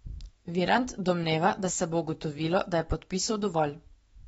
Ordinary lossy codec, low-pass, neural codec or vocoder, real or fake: AAC, 24 kbps; 19.8 kHz; autoencoder, 48 kHz, 128 numbers a frame, DAC-VAE, trained on Japanese speech; fake